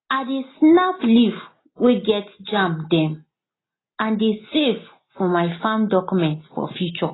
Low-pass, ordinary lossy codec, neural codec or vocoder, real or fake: 7.2 kHz; AAC, 16 kbps; none; real